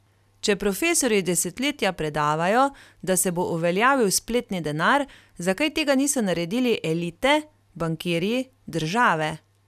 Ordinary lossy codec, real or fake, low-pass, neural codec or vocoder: none; real; 14.4 kHz; none